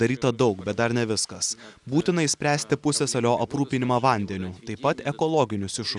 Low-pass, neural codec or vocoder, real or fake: 10.8 kHz; none; real